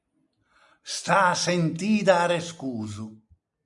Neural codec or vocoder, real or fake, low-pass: none; real; 10.8 kHz